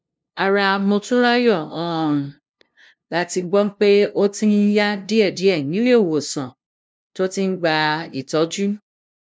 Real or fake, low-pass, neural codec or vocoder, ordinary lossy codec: fake; none; codec, 16 kHz, 0.5 kbps, FunCodec, trained on LibriTTS, 25 frames a second; none